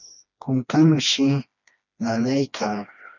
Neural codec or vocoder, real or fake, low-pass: codec, 16 kHz, 2 kbps, FreqCodec, smaller model; fake; 7.2 kHz